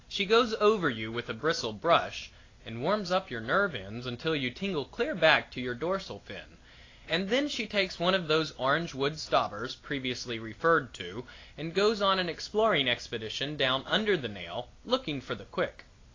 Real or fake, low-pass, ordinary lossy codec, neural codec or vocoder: real; 7.2 kHz; AAC, 32 kbps; none